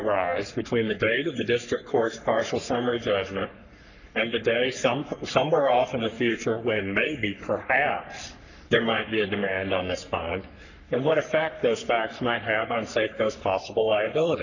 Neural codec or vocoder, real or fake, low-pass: codec, 44.1 kHz, 3.4 kbps, Pupu-Codec; fake; 7.2 kHz